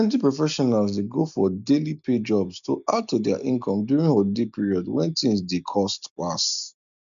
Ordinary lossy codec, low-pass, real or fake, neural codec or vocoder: none; 7.2 kHz; real; none